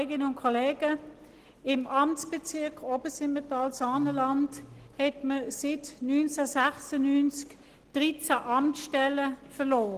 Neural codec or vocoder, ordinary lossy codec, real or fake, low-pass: none; Opus, 16 kbps; real; 14.4 kHz